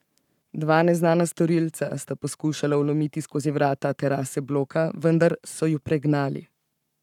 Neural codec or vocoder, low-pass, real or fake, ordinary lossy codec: codec, 44.1 kHz, 7.8 kbps, Pupu-Codec; 19.8 kHz; fake; none